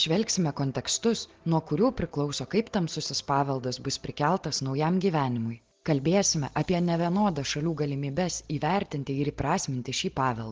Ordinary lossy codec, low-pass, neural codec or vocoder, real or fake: Opus, 16 kbps; 7.2 kHz; none; real